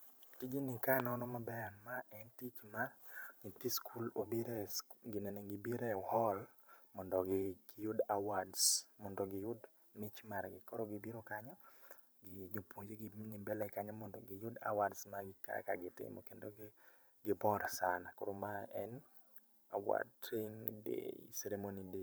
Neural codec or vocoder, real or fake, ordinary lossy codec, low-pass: vocoder, 44.1 kHz, 128 mel bands every 512 samples, BigVGAN v2; fake; none; none